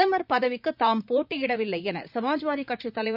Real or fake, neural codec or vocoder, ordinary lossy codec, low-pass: fake; vocoder, 44.1 kHz, 128 mel bands, Pupu-Vocoder; none; 5.4 kHz